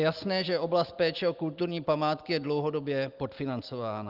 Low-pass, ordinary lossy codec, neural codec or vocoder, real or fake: 5.4 kHz; Opus, 32 kbps; none; real